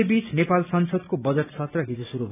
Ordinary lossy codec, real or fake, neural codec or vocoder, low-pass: none; real; none; 3.6 kHz